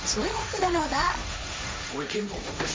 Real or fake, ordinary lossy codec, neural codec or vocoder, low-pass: fake; none; codec, 16 kHz, 1.1 kbps, Voila-Tokenizer; none